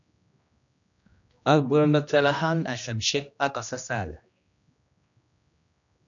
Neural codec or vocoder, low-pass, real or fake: codec, 16 kHz, 1 kbps, X-Codec, HuBERT features, trained on general audio; 7.2 kHz; fake